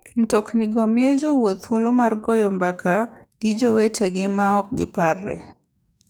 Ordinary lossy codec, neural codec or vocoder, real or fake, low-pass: none; codec, 44.1 kHz, 2.6 kbps, DAC; fake; none